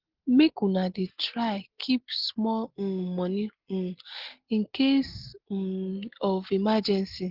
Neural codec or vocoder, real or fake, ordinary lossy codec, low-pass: none; real; Opus, 16 kbps; 5.4 kHz